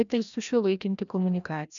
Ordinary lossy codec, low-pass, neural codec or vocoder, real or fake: MP3, 96 kbps; 7.2 kHz; codec, 16 kHz, 1 kbps, FreqCodec, larger model; fake